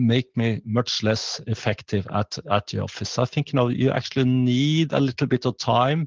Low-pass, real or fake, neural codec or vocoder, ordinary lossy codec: 7.2 kHz; real; none; Opus, 32 kbps